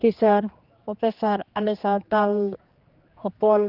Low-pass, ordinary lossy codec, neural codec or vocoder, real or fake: 5.4 kHz; Opus, 32 kbps; codec, 16 kHz, 2 kbps, X-Codec, HuBERT features, trained on general audio; fake